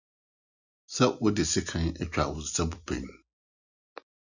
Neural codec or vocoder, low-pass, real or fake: none; 7.2 kHz; real